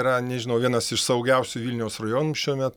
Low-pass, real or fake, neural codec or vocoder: 19.8 kHz; real; none